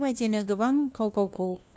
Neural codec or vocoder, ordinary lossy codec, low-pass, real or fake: codec, 16 kHz, 1 kbps, FunCodec, trained on LibriTTS, 50 frames a second; none; none; fake